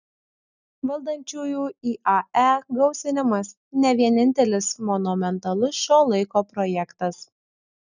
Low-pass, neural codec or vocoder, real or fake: 7.2 kHz; none; real